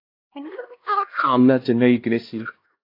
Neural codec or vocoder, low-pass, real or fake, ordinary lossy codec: codec, 16 kHz, 1 kbps, X-Codec, HuBERT features, trained on LibriSpeech; 5.4 kHz; fake; AAC, 32 kbps